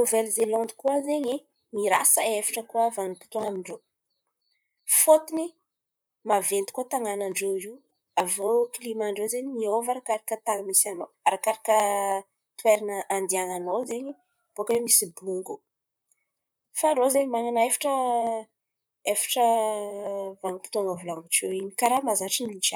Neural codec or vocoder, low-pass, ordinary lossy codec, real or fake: vocoder, 44.1 kHz, 128 mel bands, Pupu-Vocoder; none; none; fake